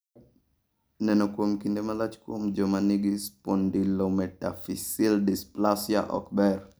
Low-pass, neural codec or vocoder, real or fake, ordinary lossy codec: none; none; real; none